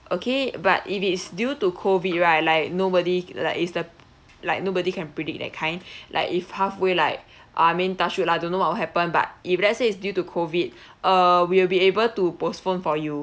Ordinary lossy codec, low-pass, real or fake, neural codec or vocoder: none; none; real; none